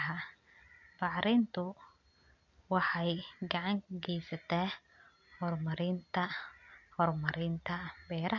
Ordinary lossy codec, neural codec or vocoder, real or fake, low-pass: MP3, 48 kbps; none; real; 7.2 kHz